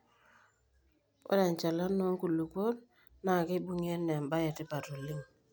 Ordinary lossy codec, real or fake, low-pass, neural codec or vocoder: none; real; none; none